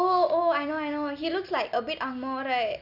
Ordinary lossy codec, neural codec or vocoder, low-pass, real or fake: none; none; 5.4 kHz; real